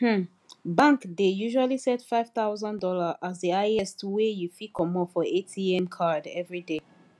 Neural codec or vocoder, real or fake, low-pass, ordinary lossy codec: none; real; none; none